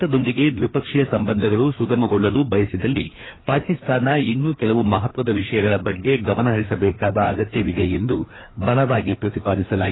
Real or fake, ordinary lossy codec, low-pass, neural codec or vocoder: fake; AAC, 16 kbps; 7.2 kHz; codec, 16 kHz, 2 kbps, FreqCodec, larger model